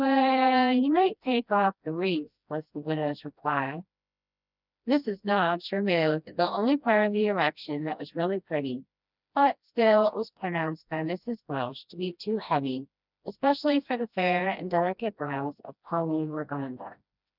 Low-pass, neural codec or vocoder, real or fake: 5.4 kHz; codec, 16 kHz, 1 kbps, FreqCodec, smaller model; fake